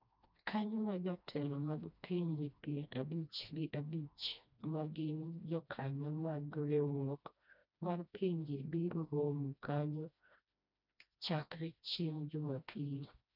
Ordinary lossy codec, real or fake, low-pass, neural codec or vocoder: none; fake; 5.4 kHz; codec, 16 kHz, 1 kbps, FreqCodec, smaller model